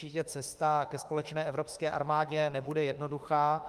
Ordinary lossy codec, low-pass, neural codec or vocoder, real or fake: Opus, 32 kbps; 14.4 kHz; autoencoder, 48 kHz, 32 numbers a frame, DAC-VAE, trained on Japanese speech; fake